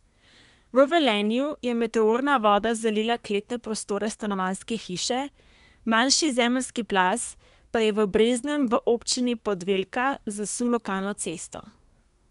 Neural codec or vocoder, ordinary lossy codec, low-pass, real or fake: codec, 24 kHz, 1 kbps, SNAC; none; 10.8 kHz; fake